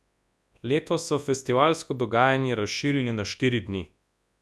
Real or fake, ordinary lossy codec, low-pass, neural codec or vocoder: fake; none; none; codec, 24 kHz, 0.9 kbps, WavTokenizer, large speech release